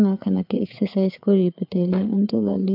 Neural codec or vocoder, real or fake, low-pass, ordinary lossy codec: codec, 16 kHz, 4 kbps, FreqCodec, larger model; fake; 5.4 kHz; MP3, 48 kbps